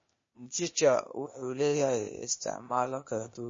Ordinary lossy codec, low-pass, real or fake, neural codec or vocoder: MP3, 32 kbps; 7.2 kHz; fake; codec, 16 kHz, 0.8 kbps, ZipCodec